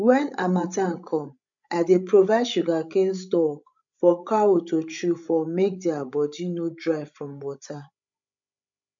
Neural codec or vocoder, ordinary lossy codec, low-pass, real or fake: codec, 16 kHz, 16 kbps, FreqCodec, larger model; MP3, 64 kbps; 7.2 kHz; fake